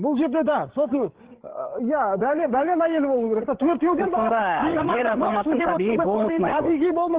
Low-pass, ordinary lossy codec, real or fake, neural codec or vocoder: 3.6 kHz; Opus, 16 kbps; fake; codec, 16 kHz, 8 kbps, FreqCodec, larger model